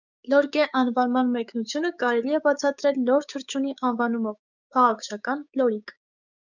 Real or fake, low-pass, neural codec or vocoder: fake; 7.2 kHz; codec, 16 kHz, 6 kbps, DAC